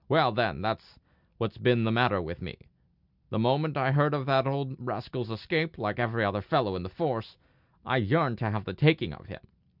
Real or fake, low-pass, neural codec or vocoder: real; 5.4 kHz; none